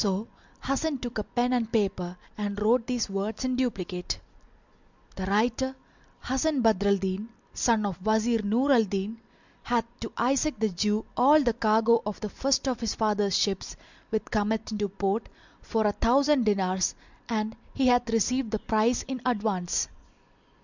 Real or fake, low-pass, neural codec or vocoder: real; 7.2 kHz; none